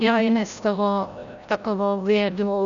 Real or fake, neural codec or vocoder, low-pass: fake; codec, 16 kHz, 0.5 kbps, FreqCodec, larger model; 7.2 kHz